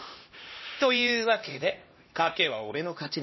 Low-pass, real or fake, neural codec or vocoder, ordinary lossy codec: 7.2 kHz; fake; codec, 16 kHz, 1 kbps, X-Codec, HuBERT features, trained on LibriSpeech; MP3, 24 kbps